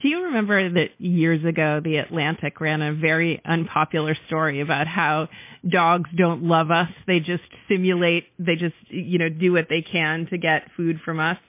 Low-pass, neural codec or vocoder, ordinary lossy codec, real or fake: 3.6 kHz; none; MP3, 24 kbps; real